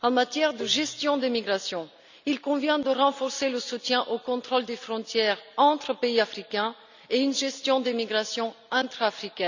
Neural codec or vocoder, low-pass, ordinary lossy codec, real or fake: none; 7.2 kHz; none; real